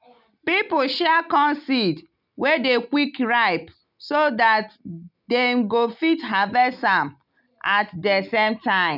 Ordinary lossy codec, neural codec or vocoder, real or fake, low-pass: none; none; real; 5.4 kHz